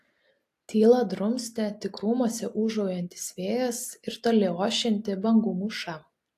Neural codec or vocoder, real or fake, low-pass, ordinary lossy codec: none; real; 14.4 kHz; AAC, 64 kbps